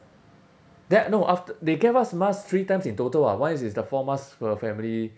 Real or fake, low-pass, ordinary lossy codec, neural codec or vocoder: real; none; none; none